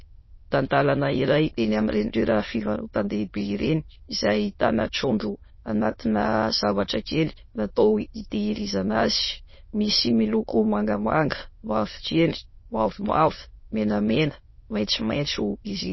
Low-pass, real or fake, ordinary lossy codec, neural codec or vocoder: 7.2 kHz; fake; MP3, 24 kbps; autoencoder, 22.05 kHz, a latent of 192 numbers a frame, VITS, trained on many speakers